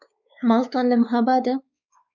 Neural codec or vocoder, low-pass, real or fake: codec, 16 kHz, 4 kbps, X-Codec, WavLM features, trained on Multilingual LibriSpeech; 7.2 kHz; fake